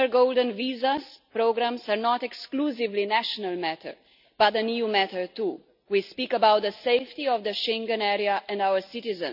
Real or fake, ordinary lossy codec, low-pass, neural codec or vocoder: real; none; 5.4 kHz; none